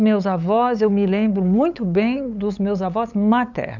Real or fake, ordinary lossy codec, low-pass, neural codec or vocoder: fake; none; 7.2 kHz; codec, 16 kHz, 8 kbps, FunCodec, trained on LibriTTS, 25 frames a second